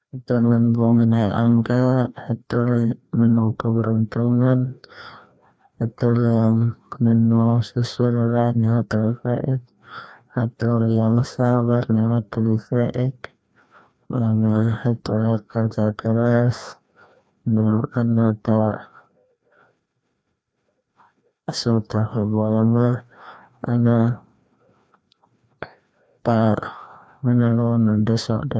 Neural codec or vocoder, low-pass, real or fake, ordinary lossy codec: codec, 16 kHz, 1 kbps, FreqCodec, larger model; none; fake; none